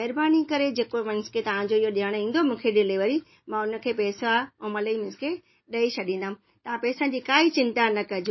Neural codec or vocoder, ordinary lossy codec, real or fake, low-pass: none; MP3, 24 kbps; real; 7.2 kHz